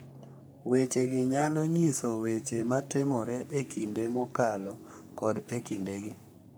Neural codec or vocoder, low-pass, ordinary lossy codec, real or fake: codec, 44.1 kHz, 3.4 kbps, Pupu-Codec; none; none; fake